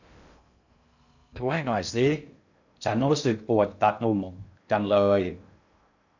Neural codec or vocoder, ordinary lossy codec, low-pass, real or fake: codec, 16 kHz in and 24 kHz out, 0.6 kbps, FocalCodec, streaming, 2048 codes; none; 7.2 kHz; fake